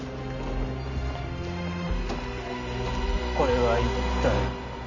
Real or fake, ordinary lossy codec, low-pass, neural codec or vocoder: real; none; 7.2 kHz; none